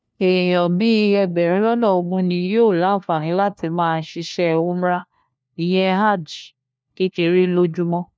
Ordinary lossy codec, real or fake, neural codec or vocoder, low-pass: none; fake; codec, 16 kHz, 1 kbps, FunCodec, trained on LibriTTS, 50 frames a second; none